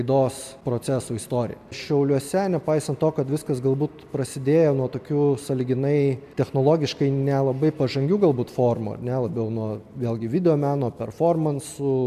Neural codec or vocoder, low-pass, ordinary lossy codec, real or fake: none; 14.4 kHz; MP3, 96 kbps; real